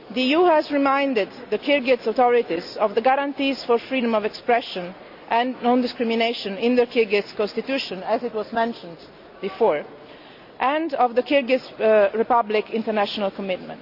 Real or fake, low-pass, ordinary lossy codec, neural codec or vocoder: real; 5.4 kHz; none; none